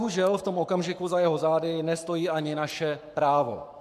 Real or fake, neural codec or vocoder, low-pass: fake; codec, 44.1 kHz, 7.8 kbps, Pupu-Codec; 14.4 kHz